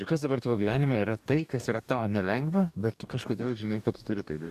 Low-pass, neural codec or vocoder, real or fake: 14.4 kHz; codec, 44.1 kHz, 2.6 kbps, DAC; fake